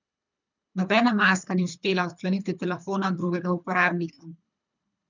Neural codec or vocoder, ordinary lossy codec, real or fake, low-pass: codec, 24 kHz, 3 kbps, HILCodec; none; fake; 7.2 kHz